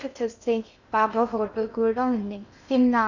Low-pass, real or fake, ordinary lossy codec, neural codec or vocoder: 7.2 kHz; fake; none; codec, 16 kHz in and 24 kHz out, 0.6 kbps, FocalCodec, streaming, 2048 codes